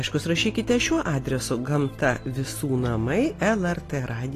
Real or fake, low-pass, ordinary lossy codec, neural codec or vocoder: real; 14.4 kHz; AAC, 48 kbps; none